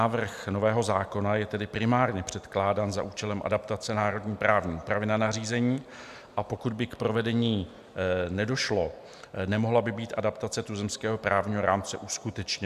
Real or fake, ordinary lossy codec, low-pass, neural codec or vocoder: real; AAC, 96 kbps; 14.4 kHz; none